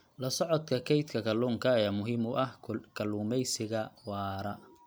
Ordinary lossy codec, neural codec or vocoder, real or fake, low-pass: none; none; real; none